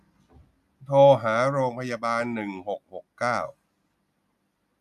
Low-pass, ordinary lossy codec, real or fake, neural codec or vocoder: 14.4 kHz; none; real; none